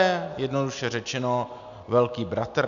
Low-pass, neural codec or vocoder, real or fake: 7.2 kHz; none; real